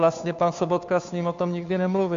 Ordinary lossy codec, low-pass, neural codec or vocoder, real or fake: AAC, 64 kbps; 7.2 kHz; codec, 16 kHz, 2 kbps, FunCodec, trained on Chinese and English, 25 frames a second; fake